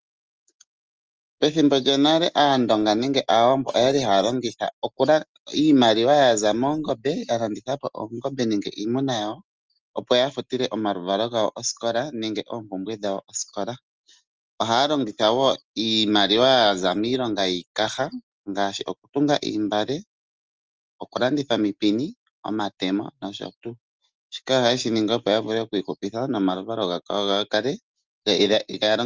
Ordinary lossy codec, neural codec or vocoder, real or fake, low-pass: Opus, 24 kbps; none; real; 7.2 kHz